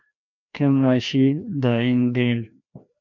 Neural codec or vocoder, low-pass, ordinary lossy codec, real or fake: codec, 16 kHz, 1 kbps, FreqCodec, larger model; 7.2 kHz; MP3, 64 kbps; fake